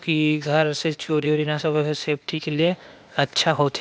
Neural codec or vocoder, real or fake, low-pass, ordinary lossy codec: codec, 16 kHz, 0.8 kbps, ZipCodec; fake; none; none